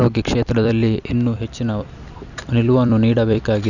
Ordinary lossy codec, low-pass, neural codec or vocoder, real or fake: none; 7.2 kHz; vocoder, 44.1 kHz, 128 mel bands every 256 samples, BigVGAN v2; fake